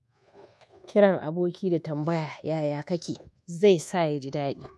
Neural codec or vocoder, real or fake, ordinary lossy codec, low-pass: codec, 24 kHz, 1.2 kbps, DualCodec; fake; none; none